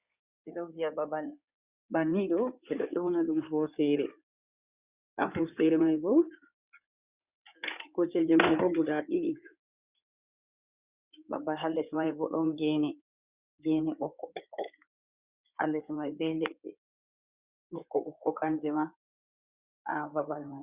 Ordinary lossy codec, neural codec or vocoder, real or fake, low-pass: Opus, 64 kbps; codec, 16 kHz in and 24 kHz out, 2.2 kbps, FireRedTTS-2 codec; fake; 3.6 kHz